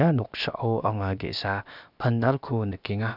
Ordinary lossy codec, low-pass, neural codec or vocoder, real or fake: none; 5.4 kHz; codec, 16 kHz, about 1 kbps, DyCAST, with the encoder's durations; fake